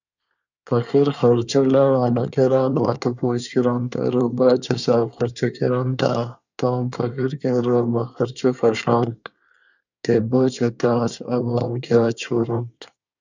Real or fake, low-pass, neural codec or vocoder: fake; 7.2 kHz; codec, 24 kHz, 1 kbps, SNAC